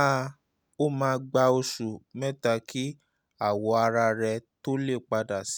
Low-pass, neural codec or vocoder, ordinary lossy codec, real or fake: none; none; none; real